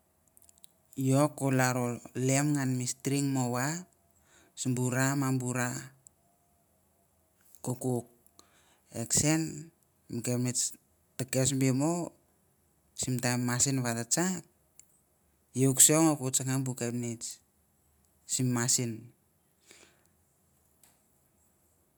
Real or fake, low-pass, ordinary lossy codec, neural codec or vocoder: real; none; none; none